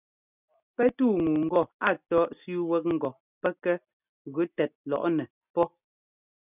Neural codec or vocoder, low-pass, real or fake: none; 3.6 kHz; real